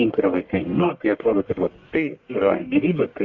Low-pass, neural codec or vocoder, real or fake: 7.2 kHz; codec, 44.1 kHz, 1.7 kbps, Pupu-Codec; fake